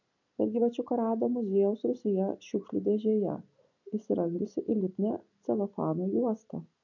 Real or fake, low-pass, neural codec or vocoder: real; 7.2 kHz; none